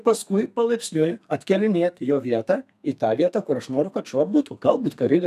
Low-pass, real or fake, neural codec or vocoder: 14.4 kHz; fake; codec, 32 kHz, 1.9 kbps, SNAC